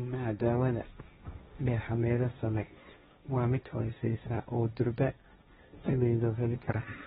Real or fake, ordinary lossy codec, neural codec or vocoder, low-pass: fake; AAC, 16 kbps; codec, 16 kHz, 1.1 kbps, Voila-Tokenizer; 7.2 kHz